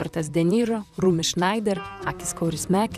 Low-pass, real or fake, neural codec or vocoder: 14.4 kHz; fake; vocoder, 44.1 kHz, 128 mel bands, Pupu-Vocoder